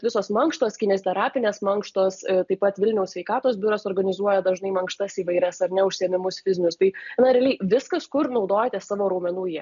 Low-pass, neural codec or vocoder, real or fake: 7.2 kHz; none; real